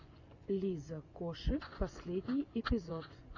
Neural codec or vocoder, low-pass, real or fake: vocoder, 24 kHz, 100 mel bands, Vocos; 7.2 kHz; fake